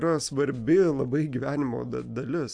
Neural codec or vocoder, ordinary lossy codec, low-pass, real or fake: none; AAC, 64 kbps; 9.9 kHz; real